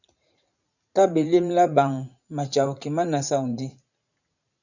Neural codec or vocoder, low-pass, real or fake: vocoder, 44.1 kHz, 80 mel bands, Vocos; 7.2 kHz; fake